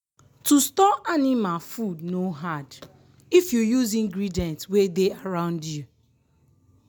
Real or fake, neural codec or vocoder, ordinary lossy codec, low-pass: real; none; none; none